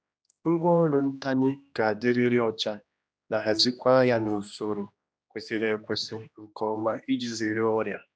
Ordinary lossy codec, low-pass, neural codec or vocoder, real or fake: none; none; codec, 16 kHz, 1 kbps, X-Codec, HuBERT features, trained on general audio; fake